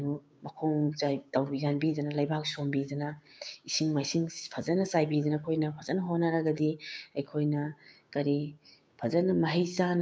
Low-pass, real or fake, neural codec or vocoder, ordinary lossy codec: none; fake; codec, 16 kHz, 6 kbps, DAC; none